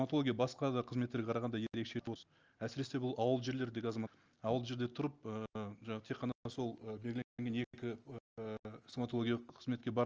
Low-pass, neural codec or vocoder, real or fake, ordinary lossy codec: 7.2 kHz; none; real; Opus, 24 kbps